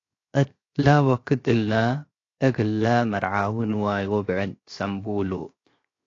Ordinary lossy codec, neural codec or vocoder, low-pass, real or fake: AAC, 32 kbps; codec, 16 kHz, 0.7 kbps, FocalCodec; 7.2 kHz; fake